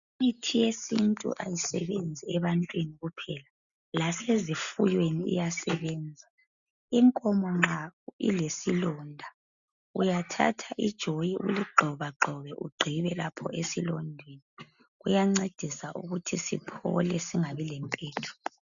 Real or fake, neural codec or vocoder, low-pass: real; none; 7.2 kHz